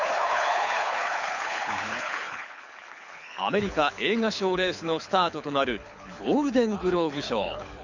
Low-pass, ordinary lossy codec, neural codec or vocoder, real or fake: 7.2 kHz; none; codec, 24 kHz, 6 kbps, HILCodec; fake